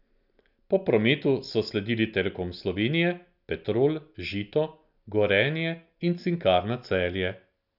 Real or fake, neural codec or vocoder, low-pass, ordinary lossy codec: real; none; 5.4 kHz; none